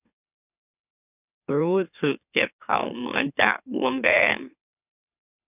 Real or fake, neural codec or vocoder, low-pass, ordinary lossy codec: fake; autoencoder, 44.1 kHz, a latent of 192 numbers a frame, MeloTTS; 3.6 kHz; none